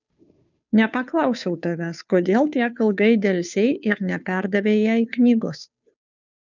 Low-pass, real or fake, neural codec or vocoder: 7.2 kHz; fake; codec, 16 kHz, 2 kbps, FunCodec, trained on Chinese and English, 25 frames a second